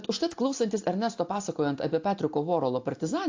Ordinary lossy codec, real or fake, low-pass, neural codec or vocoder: AAC, 48 kbps; real; 7.2 kHz; none